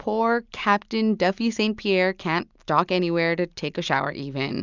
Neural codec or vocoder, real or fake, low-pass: none; real; 7.2 kHz